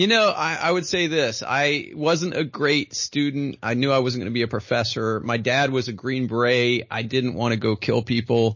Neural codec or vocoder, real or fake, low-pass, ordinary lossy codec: none; real; 7.2 kHz; MP3, 32 kbps